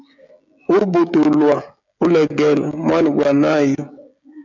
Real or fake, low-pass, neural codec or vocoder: fake; 7.2 kHz; codec, 16 kHz, 16 kbps, FreqCodec, smaller model